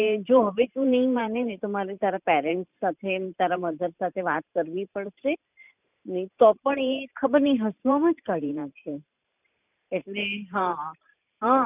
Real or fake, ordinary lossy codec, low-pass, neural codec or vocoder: fake; none; 3.6 kHz; vocoder, 44.1 kHz, 128 mel bands every 512 samples, BigVGAN v2